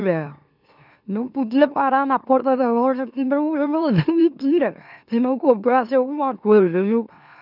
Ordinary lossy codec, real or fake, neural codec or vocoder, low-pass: none; fake; autoencoder, 44.1 kHz, a latent of 192 numbers a frame, MeloTTS; 5.4 kHz